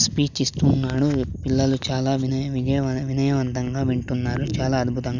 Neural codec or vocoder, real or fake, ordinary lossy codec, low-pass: none; real; none; 7.2 kHz